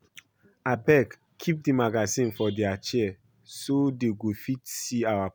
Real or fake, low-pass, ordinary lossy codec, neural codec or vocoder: real; none; none; none